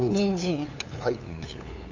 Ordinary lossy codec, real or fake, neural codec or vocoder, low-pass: AAC, 48 kbps; fake; codec, 16 kHz, 8 kbps, FunCodec, trained on LibriTTS, 25 frames a second; 7.2 kHz